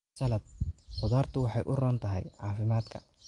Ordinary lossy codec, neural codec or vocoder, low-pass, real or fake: Opus, 32 kbps; none; 10.8 kHz; real